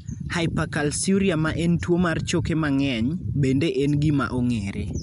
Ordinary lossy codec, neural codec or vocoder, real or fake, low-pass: MP3, 96 kbps; none; real; 10.8 kHz